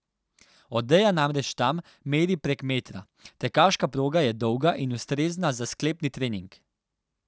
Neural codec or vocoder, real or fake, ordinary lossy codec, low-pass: none; real; none; none